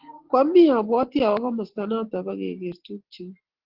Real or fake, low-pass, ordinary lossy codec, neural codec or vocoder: real; 5.4 kHz; Opus, 16 kbps; none